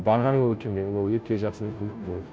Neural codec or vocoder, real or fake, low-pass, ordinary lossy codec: codec, 16 kHz, 0.5 kbps, FunCodec, trained on Chinese and English, 25 frames a second; fake; none; none